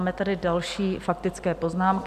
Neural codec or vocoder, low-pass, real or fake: none; 14.4 kHz; real